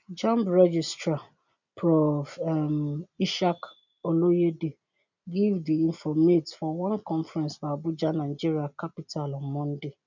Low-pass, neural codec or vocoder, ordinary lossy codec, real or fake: 7.2 kHz; none; none; real